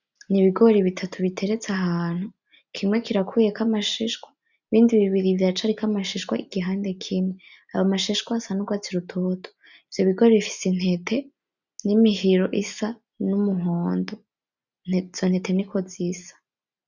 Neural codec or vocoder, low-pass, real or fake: none; 7.2 kHz; real